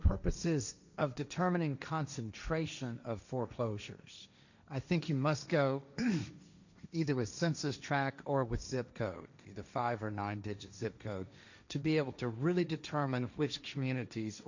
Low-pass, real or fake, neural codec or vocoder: 7.2 kHz; fake; codec, 16 kHz, 1.1 kbps, Voila-Tokenizer